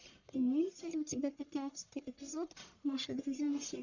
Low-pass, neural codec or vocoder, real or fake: 7.2 kHz; codec, 44.1 kHz, 1.7 kbps, Pupu-Codec; fake